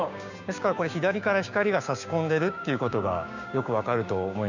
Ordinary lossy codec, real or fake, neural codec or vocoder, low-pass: none; fake; codec, 16 kHz, 6 kbps, DAC; 7.2 kHz